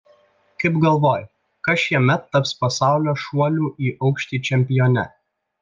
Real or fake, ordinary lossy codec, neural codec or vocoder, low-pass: real; Opus, 24 kbps; none; 7.2 kHz